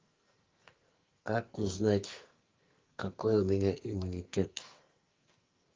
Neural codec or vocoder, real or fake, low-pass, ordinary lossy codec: codec, 44.1 kHz, 2.6 kbps, SNAC; fake; 7.2 kHz; Opus, 32 kbps